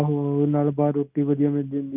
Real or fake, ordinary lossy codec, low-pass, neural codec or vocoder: real; none; 3.6 kHz; none